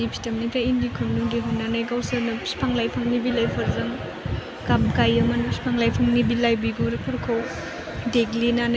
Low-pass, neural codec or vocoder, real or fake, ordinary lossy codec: none; none; real; none